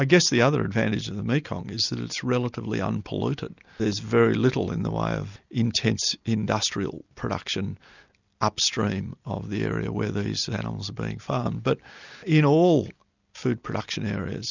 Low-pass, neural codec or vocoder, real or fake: 7.2 kHz; none; real